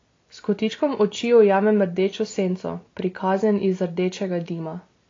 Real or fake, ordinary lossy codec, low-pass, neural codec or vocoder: real; AAC, 32 kbps; 7.2 kHz; none